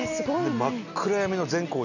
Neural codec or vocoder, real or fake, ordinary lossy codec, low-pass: none; real; none; 7.2 kHz